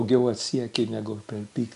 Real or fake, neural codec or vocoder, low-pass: real; none; 10.8 kHz